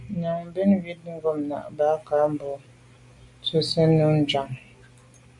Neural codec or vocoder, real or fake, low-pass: none; real; 10.8 kHz